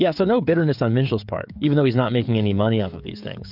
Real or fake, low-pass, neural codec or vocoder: fake; 5.4 kHz; codec, 16 kHz, 16 kbps, FreqCodec, smaller model